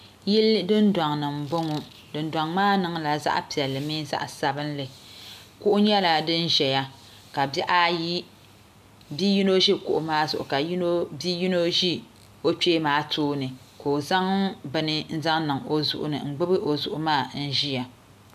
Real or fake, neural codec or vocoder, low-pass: real; none; 14.4 kHz